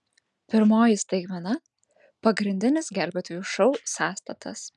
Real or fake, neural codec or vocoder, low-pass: real; none; 10.8 kHz